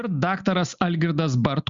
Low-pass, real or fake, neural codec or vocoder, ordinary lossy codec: 7.2 kHz; real; none; Opus, 64 kbps